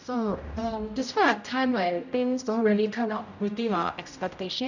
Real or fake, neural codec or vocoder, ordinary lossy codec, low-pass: fake; codec, 16 kHz, 0.5 kbps, X-Codec, HuBERT features, trained on general audio; none; 7.2 kHz